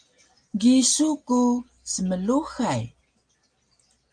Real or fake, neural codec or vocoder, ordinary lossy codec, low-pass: real; none; Opus, 32 kbps; 9.9 kHz